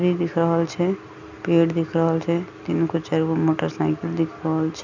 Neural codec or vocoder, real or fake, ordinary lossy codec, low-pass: none; real; none; 7.2 kHz